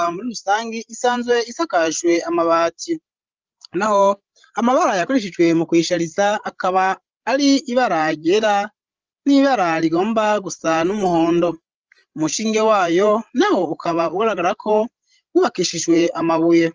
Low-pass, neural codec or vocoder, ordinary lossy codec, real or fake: 7.2 kHz; codec, 16 kHz, 16 kbps, FreqCodec, larger model; Opus, 24 kbps; fake